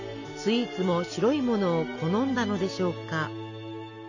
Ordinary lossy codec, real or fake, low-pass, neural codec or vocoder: none; real; 7.2 kHz; none